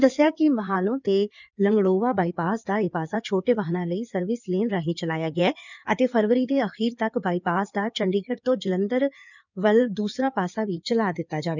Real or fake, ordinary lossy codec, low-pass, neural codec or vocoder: fake; none; 7.2 kHz; codec, 16 kHz in and 24 kHz out, 2.2 kbps, FireRedTTS-2 codec